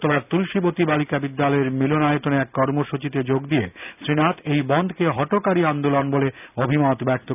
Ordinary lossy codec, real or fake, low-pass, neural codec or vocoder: none; real; 3.6 kHz; none